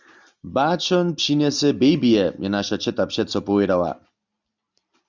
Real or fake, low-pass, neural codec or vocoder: real; 7.2 kHz; none